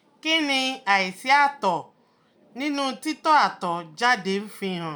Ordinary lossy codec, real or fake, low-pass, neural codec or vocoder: none; real; none; none